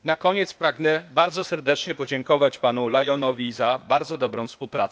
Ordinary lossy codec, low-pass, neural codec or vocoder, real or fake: none; none; codec, 16 kHz, 0.8 kbps, ZipCodec; fake